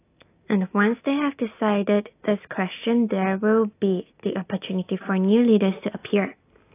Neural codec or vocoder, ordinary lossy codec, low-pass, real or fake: none; AAC, 24 kbps; 3.6 kHz; real